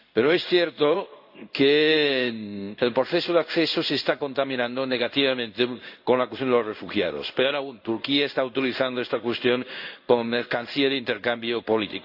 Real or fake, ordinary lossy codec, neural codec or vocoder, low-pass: fake; AAC, 48 kbps; codec, 16 kHz in and 24 kHz out, 1 kbps, XY-Tokenizer; 5.4 kHz